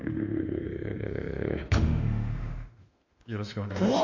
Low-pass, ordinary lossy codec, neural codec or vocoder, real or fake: 7.2 kHz; MP3, 64 kbps; autoencoder, 48 kHz, 32 numbers a frame, DAC-VAE, trained on Japanese speech; fake